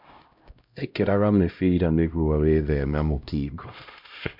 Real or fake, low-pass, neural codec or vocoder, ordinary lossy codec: fake; 5.4 kHz; codec, 16 kHz, 0.5 kbps, X-Codec, HuBERT features, trained on LibriSpeech; MP3, 48 kbps